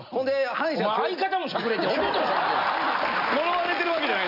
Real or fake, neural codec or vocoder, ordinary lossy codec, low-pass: real; none; none; 5.4 kHz